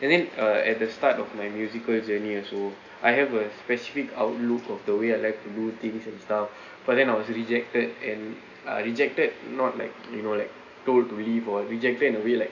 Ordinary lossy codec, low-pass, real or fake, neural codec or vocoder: none; 7.2 kHz; real; none